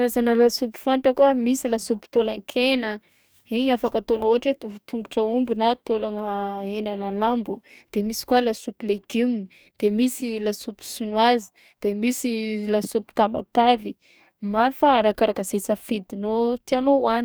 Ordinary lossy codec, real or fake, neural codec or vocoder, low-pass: none; fake; codec, 44.1 kHz, 2.6 kbps, DAC; none